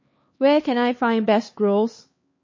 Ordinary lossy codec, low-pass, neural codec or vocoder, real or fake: MP3, 32 kbps; 7.2 kHz; codec, 16 kHz, 2 kbps, X-Codec, HuBERT features, trained on LibriSpeech; fake